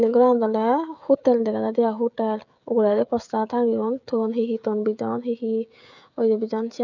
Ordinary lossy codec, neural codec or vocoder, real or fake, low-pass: none; codec, 16 kHz, 16 kbps, FreqCodec, smaller model; fake; 7.2 kHz